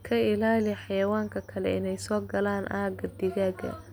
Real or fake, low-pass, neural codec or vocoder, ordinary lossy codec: real; none; none; none